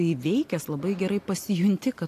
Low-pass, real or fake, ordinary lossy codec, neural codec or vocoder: 14.4 kHz; real; AAC, 64 kbps; none